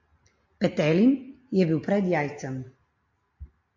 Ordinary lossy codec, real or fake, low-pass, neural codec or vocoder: MP3, 48 kbps; real; 7.2 kHz; none